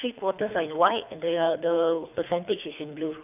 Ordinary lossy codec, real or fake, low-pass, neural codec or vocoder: none; fake; 3.6 kHz; codec, 24 kHz, 3 kbps, HILCodec